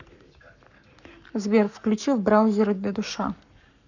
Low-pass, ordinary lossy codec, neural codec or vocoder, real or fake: 7.2 kHz; none; codec, 44.1 kHz, 7.8 kbps, Pupu-Codec; fake